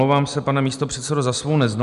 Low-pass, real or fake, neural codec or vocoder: 10.8 kHz; real; none